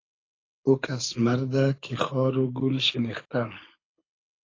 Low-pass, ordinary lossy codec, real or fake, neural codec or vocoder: 7.2 kHz; AAC, 32 kbps; fake; codec, 24 kHz, 6 kbps, HILCodec